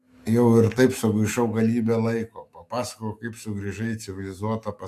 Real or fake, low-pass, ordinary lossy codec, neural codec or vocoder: fake; 14.4 kHz; AAC, 64 kbps; autoencoder, 48 kHz, 128 numbers a frame, DAC-VAE, trained on Japanese speech